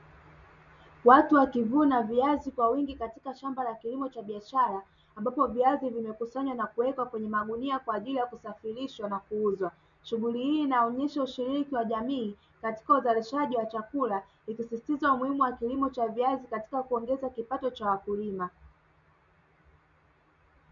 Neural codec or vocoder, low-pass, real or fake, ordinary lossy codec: none; 7.2 kHz; real; MP3, 96 kbps